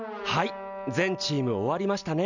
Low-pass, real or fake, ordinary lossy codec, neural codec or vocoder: 7.2 kHz; real; none; none